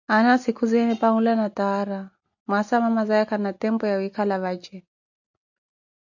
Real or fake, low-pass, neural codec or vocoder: real; 7.2 kHz; none